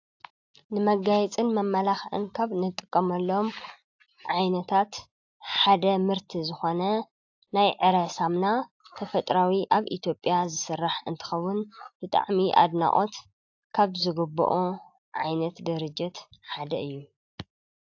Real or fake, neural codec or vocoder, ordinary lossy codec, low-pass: real; none; AAC, 48 kbps; 7.2 kHz